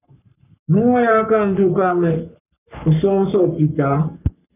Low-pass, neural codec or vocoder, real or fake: 3.6 kHz; codec, 44.1 kHz, 3.4 kbps, Pupu-Codec; fake